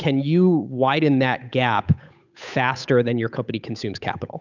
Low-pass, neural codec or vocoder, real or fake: 7.2 kHz; codec, 16 kHz, 8 kbps, FunCodec, trained on Chinese and English, 25 frames a second; fake